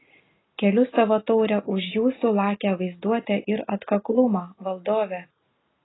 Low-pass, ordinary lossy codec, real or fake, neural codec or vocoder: 7.2 kHz; AAC, 16 kbps; real; none